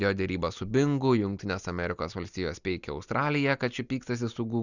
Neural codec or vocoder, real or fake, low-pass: none; real; 7.2 kHz